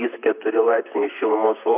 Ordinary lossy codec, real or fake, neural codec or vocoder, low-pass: AAC, 32 kbps; fake; vocoder, 44.1 kHz, 128 mel bands, Pupu-Vocoder; 3.6 kHz